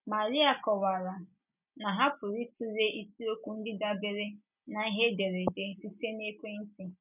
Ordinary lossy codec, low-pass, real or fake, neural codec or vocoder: none; 3.6 kHz; real; none